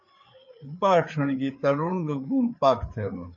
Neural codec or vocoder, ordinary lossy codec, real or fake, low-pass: codec, 16 kHz, 4 kbps, FreqCodec, larger model; MP3, 48 kbps; fake; 7.2 kHz